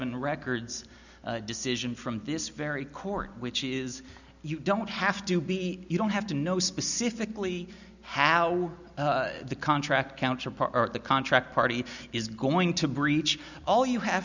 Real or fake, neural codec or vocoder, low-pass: real; none; 7.2 kHz